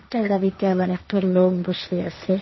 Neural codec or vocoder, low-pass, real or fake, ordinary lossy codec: codec, 16 kHz, 1.1 kbps, Voila-Tokenizer; 7.2 kHz; fake; MP3, 24 kbps